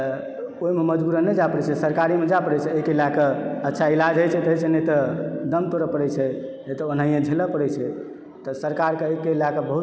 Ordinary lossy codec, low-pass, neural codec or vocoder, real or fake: none; none; none; real